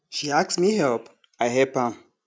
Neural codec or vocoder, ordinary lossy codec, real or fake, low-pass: none; none; real; none